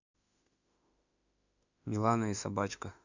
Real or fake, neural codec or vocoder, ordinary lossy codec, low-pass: fake; autoencoder, 48 kHz, 32 numbers a frame, DAC-VAE, trained on Japanese speech; none; 7.2 kHz